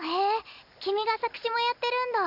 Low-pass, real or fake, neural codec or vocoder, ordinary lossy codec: 5.4 kHz; real; none; none